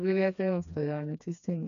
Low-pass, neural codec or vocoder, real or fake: 7.2 kHz; codec, 16 kHz, 2 kbps, FreqCodec, smaller model; fake